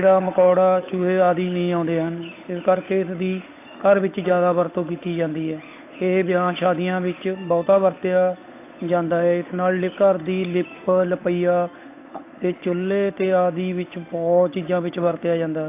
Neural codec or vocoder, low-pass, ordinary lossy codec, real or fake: codec, 16 kHz, 8 kbps, FunCodec, trained on Chinese and English, 25 frames a second; 3.6 kHz; AAC, 24 kbps; fake